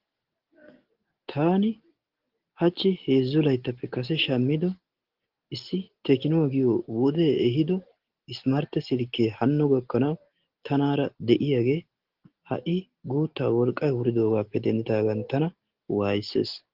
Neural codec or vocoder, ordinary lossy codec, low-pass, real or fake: none; Opus, 16 kbps; 5.4 kHz; real